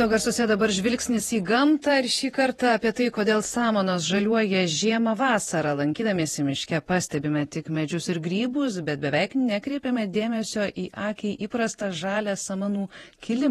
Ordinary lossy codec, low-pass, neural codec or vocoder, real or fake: AAC, 32 kbps; 19.8 kHz; vocoder, 48 kHz, 128 mel bands, Vocos; fake